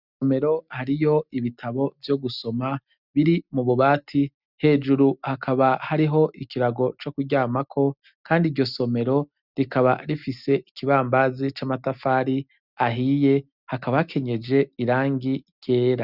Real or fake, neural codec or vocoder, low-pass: real; none; 5.4 kHz